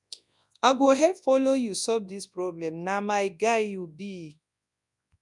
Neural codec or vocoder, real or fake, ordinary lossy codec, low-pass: codec, 24 kHz, 0.9 kbps, WavTokenizer, large speech release; fake; none; 10.8 kHz